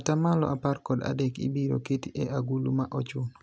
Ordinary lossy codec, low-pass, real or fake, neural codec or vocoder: none; none; real; none